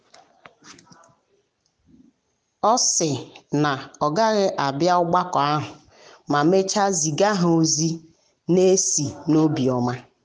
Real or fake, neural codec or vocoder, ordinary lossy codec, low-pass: real; none; none; none